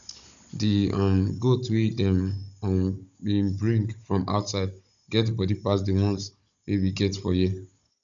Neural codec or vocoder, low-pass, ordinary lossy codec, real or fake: codec, 16 kHz, 16 kbps, FunCodec, trained on Chinese and English, 50 frames a second; 7.2 kHz; none; fake